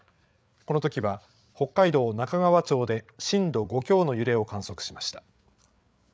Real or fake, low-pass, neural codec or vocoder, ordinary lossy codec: fake; none; codec, 16 kHz, 8 kbps, FreqCodec, larger model; none